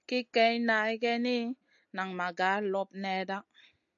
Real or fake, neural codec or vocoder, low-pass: real; none; 7.2 kHz